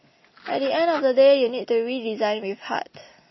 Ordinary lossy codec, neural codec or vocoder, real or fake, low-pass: MP3, 24 kbps; none; real; 7.2 kHz